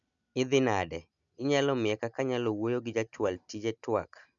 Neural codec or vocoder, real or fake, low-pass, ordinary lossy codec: none; real; 7.2 kHz; none